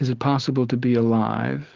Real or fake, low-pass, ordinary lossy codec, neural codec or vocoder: real; 7.2 kHz; Opus, 16 kbps; none